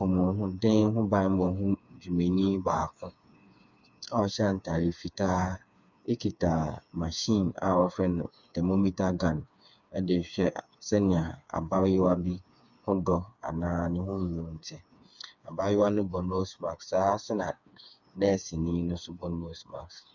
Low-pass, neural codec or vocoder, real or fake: 7.2 kHz; codec, 16 kHz, 4 kbps, FreqCodec, smaller model; fake